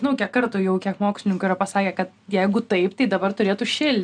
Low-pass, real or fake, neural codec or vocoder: 9.9 kHz; real; none